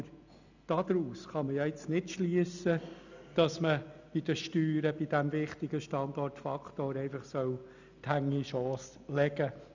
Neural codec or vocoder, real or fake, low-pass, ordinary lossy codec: none; real; 7.2 kHz; none